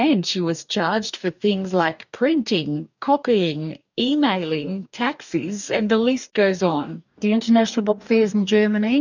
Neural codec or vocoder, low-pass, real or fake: codec, 44.1 kHz, 2.6 kbps, DAC; 7.2 kHz; fake